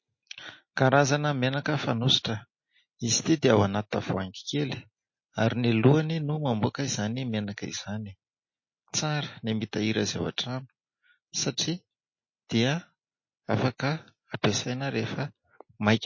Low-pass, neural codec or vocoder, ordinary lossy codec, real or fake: 7.2 kHz; none; MP3, 32 kbps; real